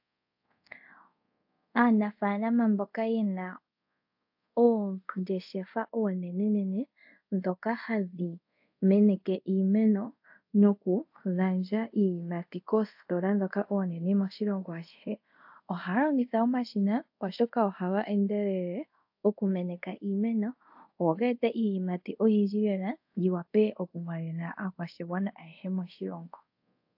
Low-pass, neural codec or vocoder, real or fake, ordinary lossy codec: 5.4 kHz; codec, 24 kHz, 0.5 kbps, DualCodec; fake; AAC, 48 kbps